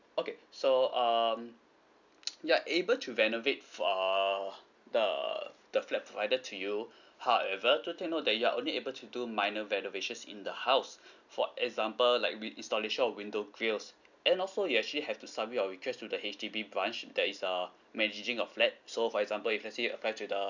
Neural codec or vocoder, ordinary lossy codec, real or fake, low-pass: none; none; real; 7.2 kHz